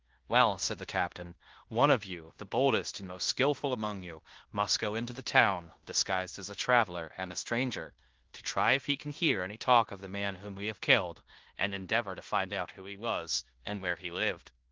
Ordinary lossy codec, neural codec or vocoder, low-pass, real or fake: Opus, 16 kbps; codec, 16 kHz in and 24 kHz out, 0.9 kbps, LongCat-Audio-Codec, fine tuned four codebook decoder; 7.2 kHz; fake